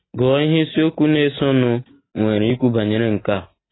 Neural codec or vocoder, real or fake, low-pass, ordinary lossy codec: none; real; 7.2 kHz; AAC, 16 kbps